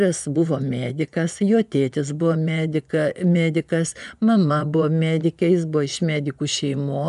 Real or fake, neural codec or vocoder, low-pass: fake; vocoder, 24 kHz, 100 mel bands, Vocos; 10.8 kHz